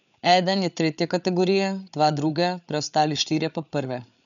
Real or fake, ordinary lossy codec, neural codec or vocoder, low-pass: fake; none; codec, 16 kHz, 8 kbps, FreqCodec, larger model; 7.2 kHz